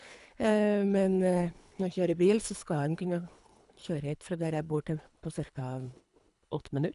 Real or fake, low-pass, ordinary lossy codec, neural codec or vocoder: fake; 10.8 kHz; none; codec, 24 kHz, 3 kbps, HILCodec